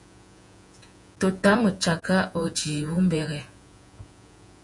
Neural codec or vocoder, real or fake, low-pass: vocoder, 48 kHz, 128 mel bands, Vocos; fake; 10.8 kHz